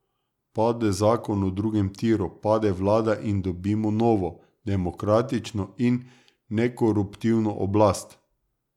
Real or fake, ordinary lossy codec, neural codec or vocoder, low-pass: real; none; none; 19.8 kHz